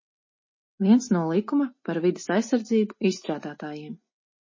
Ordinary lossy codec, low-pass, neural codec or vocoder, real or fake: MP3, 32 kbps; 7.2 kHz; none; real